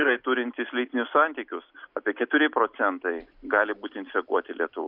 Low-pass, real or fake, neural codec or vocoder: 5.4 kHz; real; none